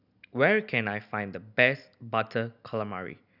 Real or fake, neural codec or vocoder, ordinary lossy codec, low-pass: real; none; none; 5.4 kHz